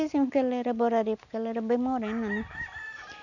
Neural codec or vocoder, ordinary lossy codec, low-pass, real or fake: none; none; 7.2 kHz; real